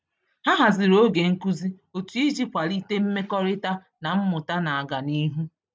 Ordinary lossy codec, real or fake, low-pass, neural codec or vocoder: none; real; none; none